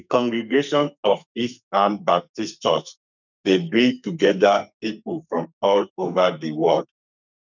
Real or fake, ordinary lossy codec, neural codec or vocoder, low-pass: fake; none; codec, 32 kHz, 1.9 kbps, SNAC; 7.2 kHz